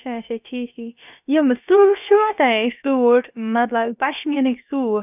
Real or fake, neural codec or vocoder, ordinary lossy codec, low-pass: fake; codec, 16 kHz, about 1 kbps, DyCAST, with the encoder's durations; none; 3.6 kHz